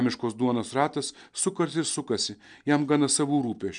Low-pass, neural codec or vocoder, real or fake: 9.9 kHz; none; real